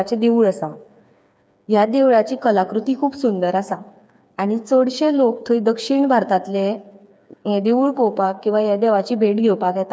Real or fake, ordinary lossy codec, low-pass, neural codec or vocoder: fake; none; none; codec, 16 kHz, 4 kbps, FreqCodec, smaller model